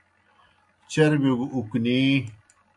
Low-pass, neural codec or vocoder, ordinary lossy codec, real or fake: 10.8 kHz; none; AAC, 64 kbps; real